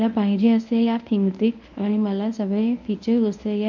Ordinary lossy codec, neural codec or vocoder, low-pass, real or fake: none; codec, 24 kHz, 0.9 kbps, WavTokenizer, medium speech release version 1; 7.2 kHz; fake